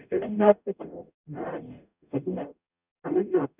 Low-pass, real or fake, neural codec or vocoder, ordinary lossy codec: 3.6 kHz; fake; codec, 44.1 kHz, 0.9 kbps, DAC; none